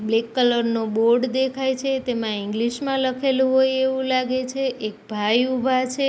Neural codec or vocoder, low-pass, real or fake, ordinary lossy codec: none; none; real; none